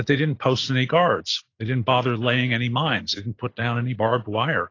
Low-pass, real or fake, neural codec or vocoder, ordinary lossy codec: 7.2 kHz; real; none; AAC, 32 kbps